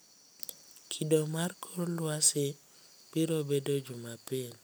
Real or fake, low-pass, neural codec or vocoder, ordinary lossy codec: real; none; none; none